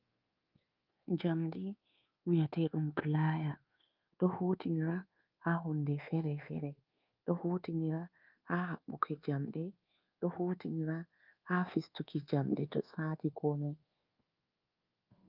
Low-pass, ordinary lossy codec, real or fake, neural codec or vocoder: 5.4 kHz; Opus, 24 kbps; fake; codec, 24 kHz, 1.2 kbps, DualCodec